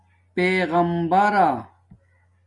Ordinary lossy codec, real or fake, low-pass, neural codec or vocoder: MP3, 96 kbps; real; 10.8 kHz; none